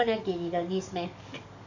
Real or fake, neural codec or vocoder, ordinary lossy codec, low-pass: fake; codec, 44.1 kHz, 7.8 kbps, DAC; none; 7.2 kHz